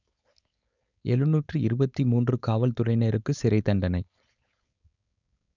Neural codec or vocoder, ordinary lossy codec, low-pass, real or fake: codec, 16 kHz, 4.8 kbps, FACodec; none; 7.2 kHz; fake